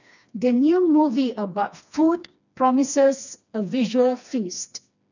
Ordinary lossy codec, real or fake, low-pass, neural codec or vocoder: none; fake; 7.2 kHz; codec, 16 kHz, 2 kbps, FreqCodec, smaller model